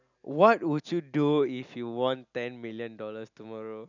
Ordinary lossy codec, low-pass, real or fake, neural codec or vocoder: none; 7.2 kHz; real; none